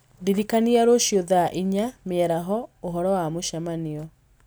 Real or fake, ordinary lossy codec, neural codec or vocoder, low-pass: real; none; none; none